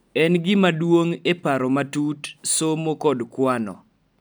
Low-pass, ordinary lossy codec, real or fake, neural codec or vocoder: none; none; real; none